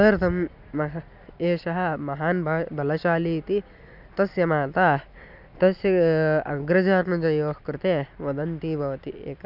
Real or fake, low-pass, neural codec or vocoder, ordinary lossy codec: real; 5.4 kHz; none; none